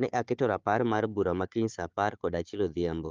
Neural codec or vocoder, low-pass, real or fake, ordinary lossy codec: codec, 16 kHz, 4 kbps, FunCodec, trained on Chinese and English, 50 frames a second; 7.2 kHz; fake; Opus, 32 kbps